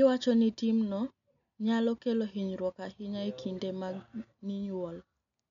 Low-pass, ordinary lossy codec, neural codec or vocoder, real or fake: 7.2 kHz; none; none; real